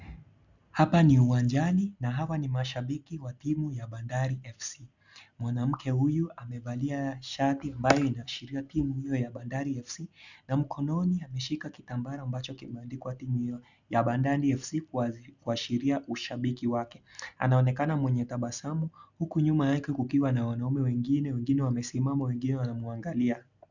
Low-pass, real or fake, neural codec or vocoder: 7.2 kHz; real; none